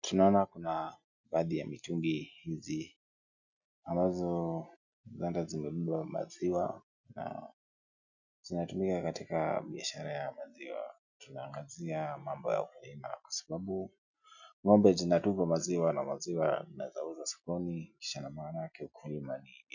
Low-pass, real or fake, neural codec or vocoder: 7.2 kHz; real; none